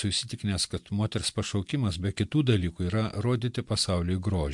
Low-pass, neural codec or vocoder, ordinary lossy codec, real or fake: 10.8 kHz; none; MP3, 64 kbps; real